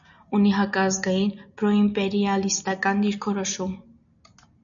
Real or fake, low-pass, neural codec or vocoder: real; 7.2 kHz; none